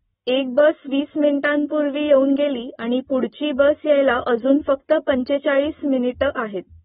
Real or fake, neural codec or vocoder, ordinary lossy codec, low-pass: real; none; AAC, 16 kbps; 19.8 kHz